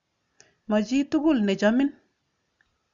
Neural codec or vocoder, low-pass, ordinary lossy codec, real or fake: none; 7.2 kHz; Opus, 64 kbps; real